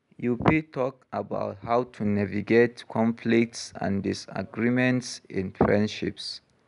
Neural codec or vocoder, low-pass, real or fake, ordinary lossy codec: none; 10.8 kHz; real; none